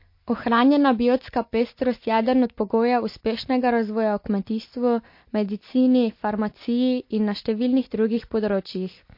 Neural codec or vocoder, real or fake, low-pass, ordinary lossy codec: none; real; 5.4 kHz; MP3, 32 kbps